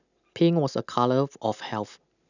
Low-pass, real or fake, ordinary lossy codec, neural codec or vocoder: 7.2 kHz; real; none; none